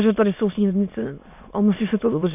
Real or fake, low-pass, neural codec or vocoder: fake; 3.6 kHz; autoencoder, 22.05 kHz, a latent of 192 numbers a frame, VITS, trained on many speakers